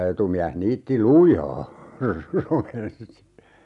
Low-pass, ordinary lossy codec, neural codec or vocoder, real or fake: 10.8 kHz; none; none; real